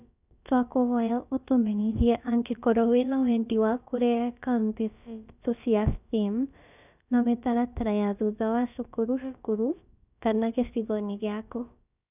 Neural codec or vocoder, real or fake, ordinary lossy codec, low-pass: codec, 16 kHz, about 1 kbps, DyCAST, with the encoder's durations; fake; none; 3.6 kHz